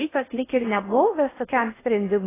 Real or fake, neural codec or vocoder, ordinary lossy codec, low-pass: fake; codec, 16 kHz in and 24 kHz out, 0.6 kbps, FocalCodec, streaming, 2048 codes; AAC, 16 kbps; 3.6 kHz